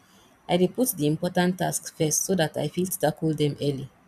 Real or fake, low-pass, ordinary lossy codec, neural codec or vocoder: fake; 14.4 kHz; none; vocoder, 44.1 kHz, 128 mel bands every 256 samples, BigVGAN v2